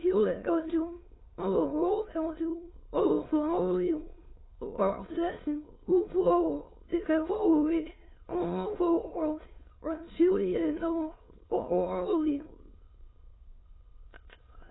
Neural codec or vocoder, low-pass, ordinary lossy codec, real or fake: autoencoder, 22.05 kHz, a latent of 192 numbers a frame, VITS, trained on many speakers; 7.2 kHz; AAC, 16 kbps; fake